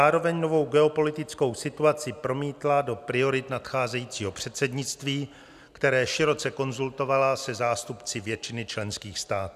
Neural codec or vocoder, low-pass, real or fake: none; 14.4 kHz; real